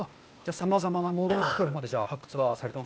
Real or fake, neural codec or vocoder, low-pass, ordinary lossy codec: fake; codec, 16 kHz, 0.8 kbps, ZipCodec; none; none